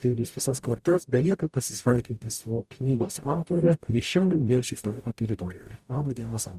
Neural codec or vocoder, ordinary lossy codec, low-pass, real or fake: codec, 44.1 kHz, 0.9 kbps, DAC; Opus, 64 kbps; 14.4 kHz; fake